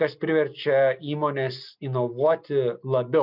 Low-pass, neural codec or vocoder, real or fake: 5.4 kHz; none; real